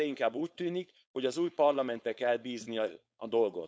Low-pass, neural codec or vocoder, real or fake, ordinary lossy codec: none; codec, 16 kHz, 4.8 kbps, FACodec; fake; none